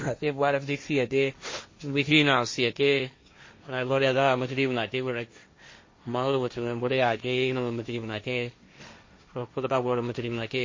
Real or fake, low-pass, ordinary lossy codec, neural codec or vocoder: fake; 7.2 kHz; MP3, 32 kbps; codec, 16 kHz, 1.1 kbps, Voila-Tokenizer